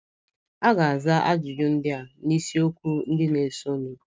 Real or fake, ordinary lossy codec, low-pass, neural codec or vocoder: real; none; none; none